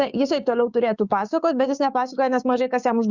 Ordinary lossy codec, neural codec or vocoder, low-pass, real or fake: Opus, 64 kbps; codec, 16 kHz, 6 kbps, DAC; 7.2 kHz; fake